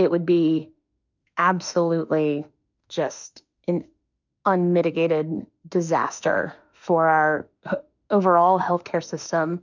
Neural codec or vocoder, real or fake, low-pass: autoencoder, 48 kHz, 32 numbers a frame, DAC-VAE, trained on Japanese speech; fake; 7.2 kHz